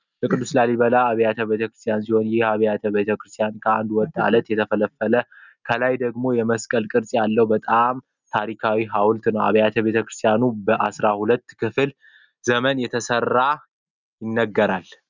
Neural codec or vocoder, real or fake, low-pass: none; real; 7.2 kHz